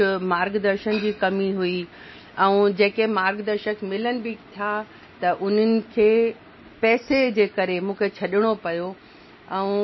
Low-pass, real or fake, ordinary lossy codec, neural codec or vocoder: 7.2 kHz; real; MP3, 24 kbps; none